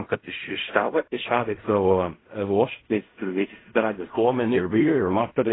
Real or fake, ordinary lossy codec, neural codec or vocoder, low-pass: fake; AAC, 16 kbps; codec, 16 kHz in and 24 kHz out, 0.4 kbps, LongCat-Audio-Codec, fine tuned four codebook decoder; 7.2 kHz